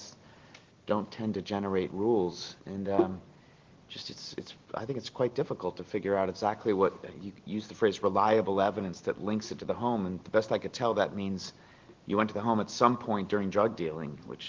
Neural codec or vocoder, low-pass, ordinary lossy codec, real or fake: none; 7.2 kHz; Opus, 16 kbps; real